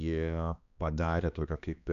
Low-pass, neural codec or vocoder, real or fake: 7.2 kHz; codec, 16 kHz, 2 kbps, X-Codec, HuBERT features, trained on balanced general audio; fake